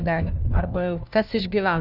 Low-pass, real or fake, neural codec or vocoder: 5.4 kHz; fake; codec, 16 kHz, 1 kbps, FunCodec, trained on LibriTTS, 50 frames a second